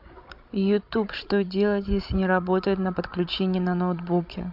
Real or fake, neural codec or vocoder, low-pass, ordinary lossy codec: fake; codec, 16 kHz, 16 kbps, FreqCodec, larger model; 5.4 kHz; MP3, 48 kbps